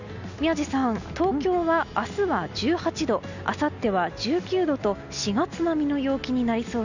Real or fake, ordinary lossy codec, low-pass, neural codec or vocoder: real; none; 7.2 kHz; none